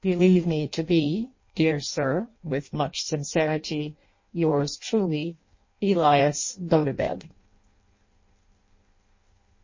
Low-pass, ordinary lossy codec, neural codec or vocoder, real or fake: 7.2 kHz; MP3, 32 kbps; codec, 16 kHz in and 24 kHz out, 0.6 kbps, FireRedTTS-2 codec; fake